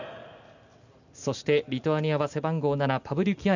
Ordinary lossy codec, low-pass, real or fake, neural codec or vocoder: none; 7.2 kHz; real; none